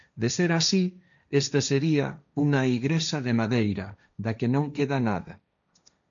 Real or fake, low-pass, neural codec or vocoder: fake; 7.2 kHz; codec, 16 kHz, 1.1 kbps, Voila-Tokenizer